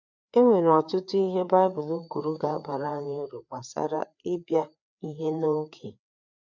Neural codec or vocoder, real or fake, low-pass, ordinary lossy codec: codec, 16 kHz, 4 kbps, FreqCodec, larger model; fake; 7.2 kHz; none